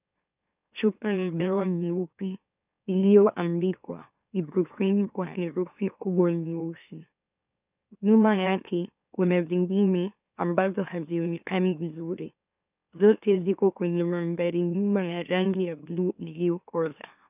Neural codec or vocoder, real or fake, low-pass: autoencoder, 44.1 kHz, a latent of 192 numbers a frame, MeloTTS; fake; 3.6 kHz